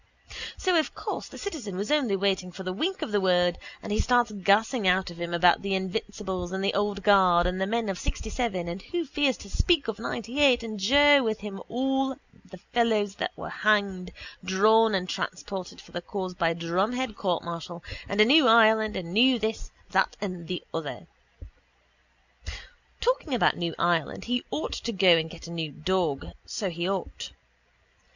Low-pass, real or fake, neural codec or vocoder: 7.2 kHz; real; none